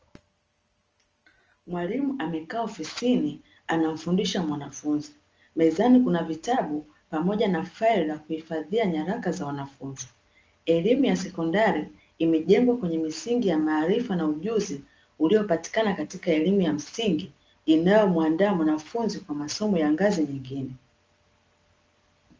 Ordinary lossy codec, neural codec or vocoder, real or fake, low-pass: Opus, 24 kbps; none; real; 7.2 kHz